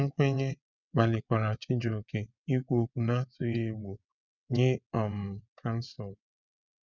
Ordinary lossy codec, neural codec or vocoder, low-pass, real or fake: none; vocoder, 22.05 kHz, 80 mel bands, WaveNeXt; 7.2 kHz; fake